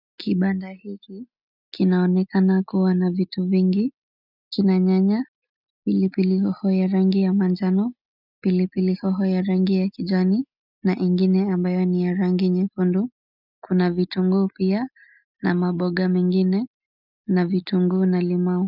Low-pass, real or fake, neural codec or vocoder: 5.4 kHz; real; none